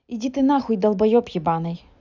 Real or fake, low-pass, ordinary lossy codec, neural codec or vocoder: real; 7.2 kHz; none; none